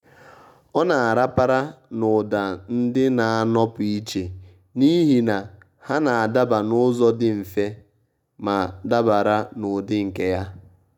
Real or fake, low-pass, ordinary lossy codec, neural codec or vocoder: real; 19.8 kHz; none; none